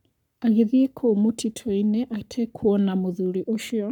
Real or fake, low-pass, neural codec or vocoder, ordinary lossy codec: fake; 19.8 kHz; codec, 44.1 kHz, 7.8 kbps, Pupu-Codec; none